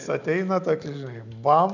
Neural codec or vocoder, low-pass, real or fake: none; 7.2 kHz; real